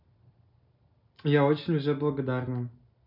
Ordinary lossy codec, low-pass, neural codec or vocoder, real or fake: none; 5.4 kHz; none; real